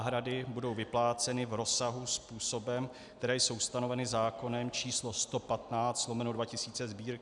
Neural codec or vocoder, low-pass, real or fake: none; 10.8 kHz; real